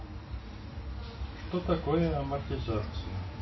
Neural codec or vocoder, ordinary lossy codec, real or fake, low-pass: none; MP3, 24 kbps; real; 7.2 kHz